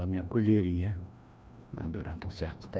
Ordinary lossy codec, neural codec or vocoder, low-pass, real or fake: none; codec, 16 kHz, 1 kbps, FreqCodec, larger model; none; fake